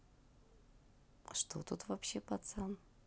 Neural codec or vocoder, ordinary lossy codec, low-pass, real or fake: none; none; none; real